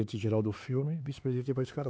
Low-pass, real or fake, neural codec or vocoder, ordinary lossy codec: none; fake; codec, 16 kHz, 4 kbps, X-Codec, HuBERT features, trained on LibriSpeech; none